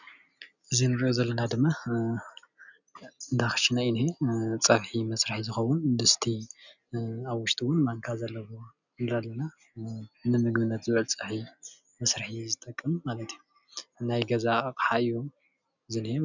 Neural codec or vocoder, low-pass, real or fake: none; 7.2 kHz; real